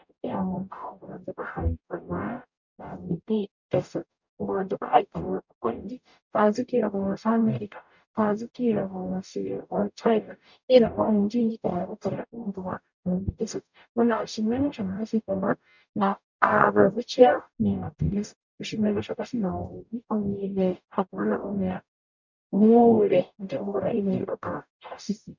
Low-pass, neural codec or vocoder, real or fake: 7.2 kHz; codec, 44.1 kHz, 0.9 kbps, DAC; fake